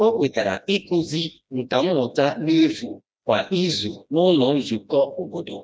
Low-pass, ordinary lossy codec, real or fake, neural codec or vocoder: none; none; fake; codec, 16 kHz, 1 kbps, FreqCodec, smaller model